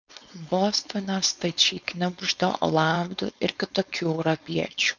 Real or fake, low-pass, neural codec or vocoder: fake; 7.2 kHz; codec, 16 kHz, 4.8 kbps, FACodec